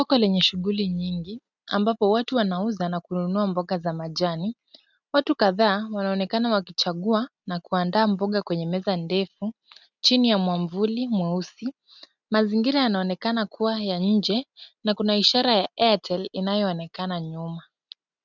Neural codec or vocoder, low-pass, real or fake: none; 7.2 kHz; real